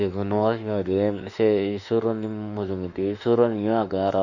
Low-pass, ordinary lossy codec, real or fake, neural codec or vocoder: 7.2 kHz; none; fake; autoencoder, 48 kHz, 32 numbers a frame, DAC-VAE, trained on Japanese speech